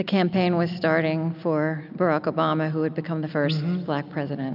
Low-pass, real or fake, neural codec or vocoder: 5.4 kHz; real; none